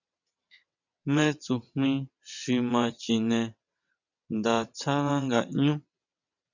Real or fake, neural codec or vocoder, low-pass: fake; vocoder, 22.05 kHz, 80 mel bands, WaveNeXt; 7.2 kHz